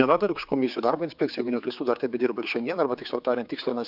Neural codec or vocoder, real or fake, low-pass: codec, 16 kHz, 4 kbps, X-Codec, HuBERT features, trained on general audio; fake; 5.4 kHz